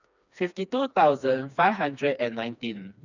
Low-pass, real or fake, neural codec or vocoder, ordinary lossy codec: 7.2 kHz; fake; codec, 16 kHz, 2 kbps, FreqCodec, smaller model; none